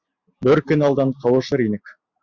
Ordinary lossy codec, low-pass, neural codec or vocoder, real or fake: Opus, 64 kbps; 7.2 kHz; none; real